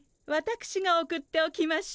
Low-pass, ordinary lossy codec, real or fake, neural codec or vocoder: none; none; real; none